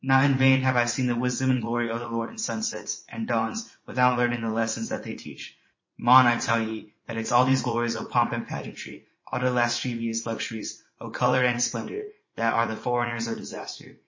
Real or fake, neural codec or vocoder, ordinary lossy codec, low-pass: fake; vocoder, 44.1 kHz, 80 mel bands, Vocos; MP3, 32 kbps; 7.2 kHz